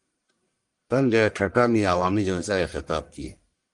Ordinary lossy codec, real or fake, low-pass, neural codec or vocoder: Opus, 32 kbps; fake; 10.8 kHz; codec, 44.1 kHz, 1.7 kbps, Pupu-Codec